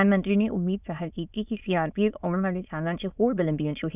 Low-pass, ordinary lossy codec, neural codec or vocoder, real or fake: 3.6 kHz; none; autoencoder, 22.05 kHz, a latent of 192 numbers a frame, VITS, trained on many speakers; fake